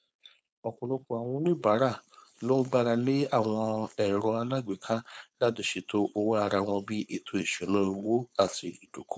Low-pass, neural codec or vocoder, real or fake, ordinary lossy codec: none; codec, 16 kHz, 4.8 kbps, FACodec; fake; none